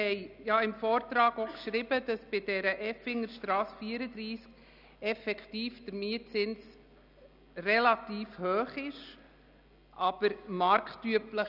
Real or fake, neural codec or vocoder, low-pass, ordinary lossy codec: real; none; 5.4 kHz; none